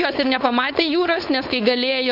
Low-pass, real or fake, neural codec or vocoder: 5.4 kHz; fake; codec, 16 kHz, 4.8 kbps, FACodec